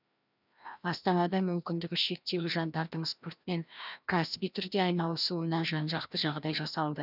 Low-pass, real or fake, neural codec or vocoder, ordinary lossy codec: 5.4 kHz; fake; codec, 16 kHz, 1 kbps, FreqCodec, larger model; none